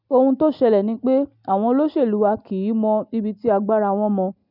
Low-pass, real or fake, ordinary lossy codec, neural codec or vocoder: 5.4 kHz; real; none; none